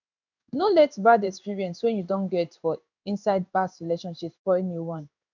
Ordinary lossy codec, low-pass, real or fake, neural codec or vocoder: none; 7.2 kHz; fake; codec, 16 kHz in and 24 kHz out, 1 kbps, XY-Tokenizer